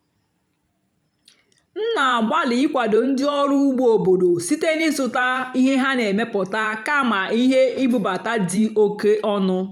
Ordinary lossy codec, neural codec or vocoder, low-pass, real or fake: none; vocoder, 44.1 kHz, 128 mel bands every 512 samples, BigVGAN v2; 19.8 kHz; fake